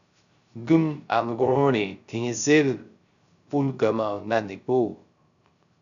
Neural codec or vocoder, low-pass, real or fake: codec, 16 kHz, 0.3 kbps, FocalCodec; 7.2 kHz; fake